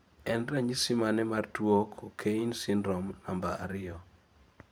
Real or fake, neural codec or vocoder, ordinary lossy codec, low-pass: fake; vocoder, 44.1 kHz, 128 mel bands every 512 samples, BigVGAN v2; none; none